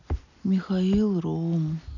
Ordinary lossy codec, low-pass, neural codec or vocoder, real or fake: none; 7.2 kHz; none; real